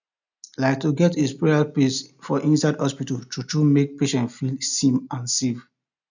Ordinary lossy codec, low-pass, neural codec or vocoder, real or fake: none; 7.2 kHz; none; real